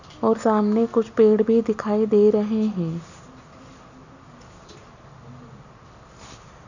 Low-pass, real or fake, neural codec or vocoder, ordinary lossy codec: 7.2 kHz; real; none; none